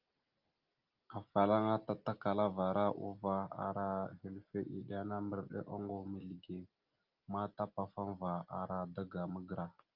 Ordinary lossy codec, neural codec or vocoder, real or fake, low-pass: Opus, 24 kbps; none; real; 5.4 kHz